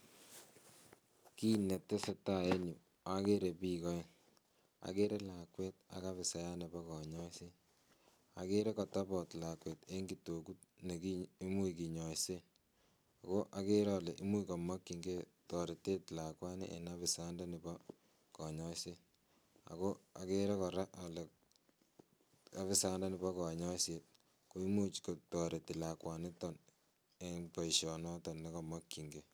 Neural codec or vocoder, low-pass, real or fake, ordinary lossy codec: none; none; real; none